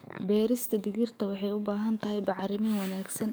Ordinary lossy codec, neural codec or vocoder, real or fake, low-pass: none; codec, 44.1 kHz, 7.8 kbps, DAC; fake; none